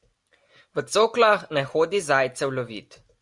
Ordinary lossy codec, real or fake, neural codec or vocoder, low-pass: Opus, 64 kbps; real; none; 10.8 kHz